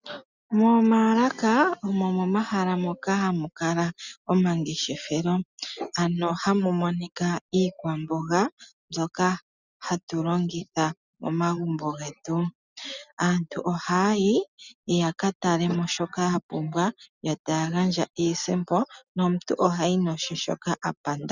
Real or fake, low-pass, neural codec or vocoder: real; 7.2 kHz; none